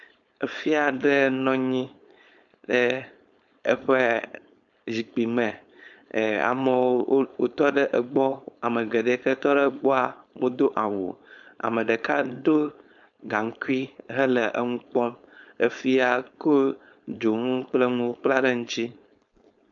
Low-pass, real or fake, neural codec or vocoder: 7.2 kHz; fake; codec, 16 kHz, 4.8 kbps, FACodec